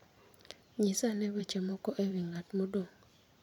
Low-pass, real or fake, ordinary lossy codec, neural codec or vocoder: 19.8 kHz; fake; none; vocoder, 44.1 kHz, 128 mel bands every 512 samples, BigVGAN v2